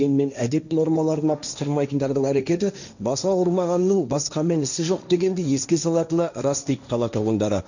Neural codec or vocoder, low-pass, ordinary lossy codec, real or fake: codec, 16 kHz, 1.1 kbps, Voila-Tokenizer; 7.2 kHz; none; fake